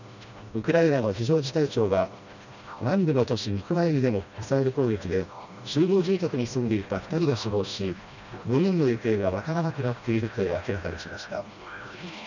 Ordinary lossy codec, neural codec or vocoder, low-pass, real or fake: none; codec, 16 kHz, 1 kbps, FreqCodec, smaller model; 7.2 kHz; fake